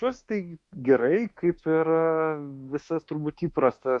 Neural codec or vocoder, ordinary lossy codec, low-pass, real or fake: autoencoder, 48 kHz, 32 numbers a frame, DAC-VAE, trained on Japanese speech; MP3, 64 kbps; 10.8 kHz; fake